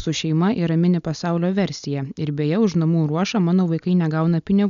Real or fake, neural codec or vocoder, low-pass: real; none; 7.2 kHz